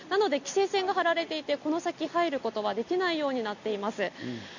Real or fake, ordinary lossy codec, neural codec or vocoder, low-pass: real; none; none; 7.2 kHz